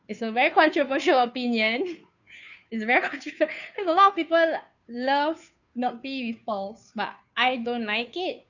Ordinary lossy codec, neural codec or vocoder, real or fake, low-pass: none; codec, 16 kHz, 2 kbps, FunCodec, trained on Chinese and English, 25 frames a second; fake; 7.2 kHz